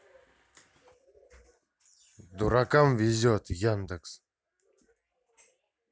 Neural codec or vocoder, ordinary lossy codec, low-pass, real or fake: none; none; none; real